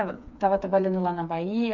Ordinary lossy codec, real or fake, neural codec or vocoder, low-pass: none; fake; codec, 16 kHz, 4 kbps, FreqCodec, smaller model; 7.2 kHz